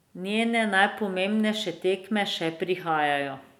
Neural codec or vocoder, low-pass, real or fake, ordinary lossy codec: none; 19.8 kHz; real; none